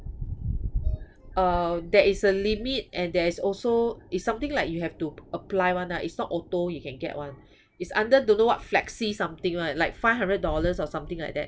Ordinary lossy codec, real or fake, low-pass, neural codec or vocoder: none; real; none; none